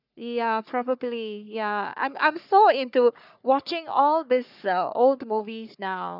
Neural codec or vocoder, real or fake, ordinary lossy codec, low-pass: codec, 44.1 kHz, 3.4 kbps, Pupu-Codec; fake; none; 5.4 kHz